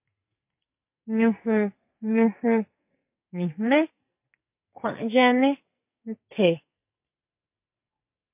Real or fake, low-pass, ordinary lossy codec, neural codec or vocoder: fake; 3.6 kHz; MP3, 32 kbps; codec, 44.1 kHz, 2.6 kbps, SNAC